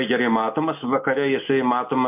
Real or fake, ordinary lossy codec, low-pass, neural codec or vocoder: real; MP3, 32 kbps; 3.6 kHz; none